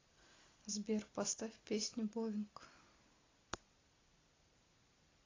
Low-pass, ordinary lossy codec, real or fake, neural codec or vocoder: 7.2 kHz; AAC, 32 kbps; real; none